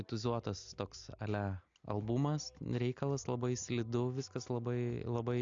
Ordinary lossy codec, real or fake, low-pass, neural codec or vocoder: AAC, 64 kbps; real; 7.2 kHz; none